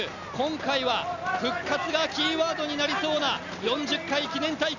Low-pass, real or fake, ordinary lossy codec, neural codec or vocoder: 7.2 kHz; real; none; none